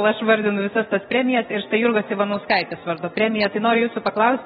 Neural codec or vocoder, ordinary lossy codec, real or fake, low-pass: none; AAC, 16 kbps; real; 7.2 kHz